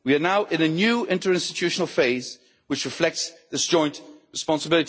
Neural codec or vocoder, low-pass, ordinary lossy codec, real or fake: none; none; none; real